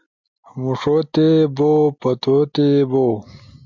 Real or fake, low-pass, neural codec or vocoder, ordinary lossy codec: real; 7.2 kHz; none; MP3, 64 kbps